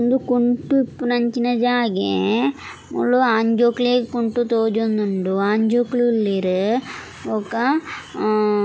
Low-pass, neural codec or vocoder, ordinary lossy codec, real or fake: none; none; none; real